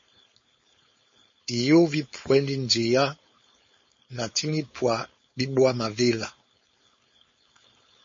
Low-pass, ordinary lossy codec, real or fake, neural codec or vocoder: 7.2 kHz; MP3, 32 kbps; fake; codec, 16 kHz, 4.8 kbps, FACodec